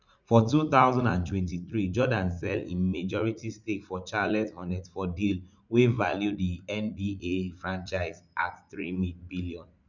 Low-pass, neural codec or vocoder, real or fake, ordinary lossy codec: 7.2 kHz; vocoder, 44.1 kHz, 80 mel bands, Vocos; fake; none